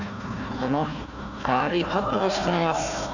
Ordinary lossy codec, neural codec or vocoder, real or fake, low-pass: none; codec, 16 kHz, 1 kbps, FunCodec, trained on Chinese and English, 50 frames a second; fake; 7.2 kHz